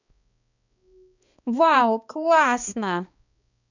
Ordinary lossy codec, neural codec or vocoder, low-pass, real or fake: none; codec, 16 kHz, 2 kbps, X-Codec, HuBERT features, trained on balanced general audio; 7.2 kHz; fake